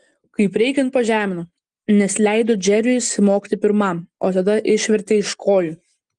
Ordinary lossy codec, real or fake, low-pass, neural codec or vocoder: Opus, 24 kbps; real; 10.8 kHz; none